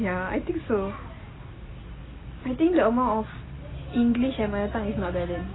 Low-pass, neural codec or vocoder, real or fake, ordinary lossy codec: 7.2 kHz; none; real; AAC, 16 kbps